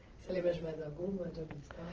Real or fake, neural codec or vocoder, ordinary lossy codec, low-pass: fake; vocoder, 44.1 kHz, 128 mel bands every 512 samples, BigVGAN v2; Opus, 16 kbps; 7.2 kHz